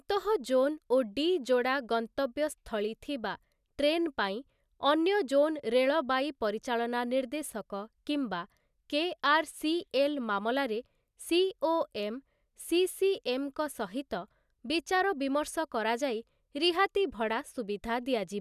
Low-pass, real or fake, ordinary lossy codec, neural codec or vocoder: 14.4 kHz; real; none; none